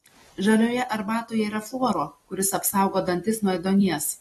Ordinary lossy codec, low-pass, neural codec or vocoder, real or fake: AAC, 32 kbps; 19.8 kHz; none; real